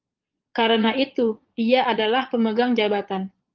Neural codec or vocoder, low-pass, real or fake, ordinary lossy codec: vocoder, 22.05 kHz, 80 mel bands, Vocos; 7.2 kHz; fake; Opus, 32 kbps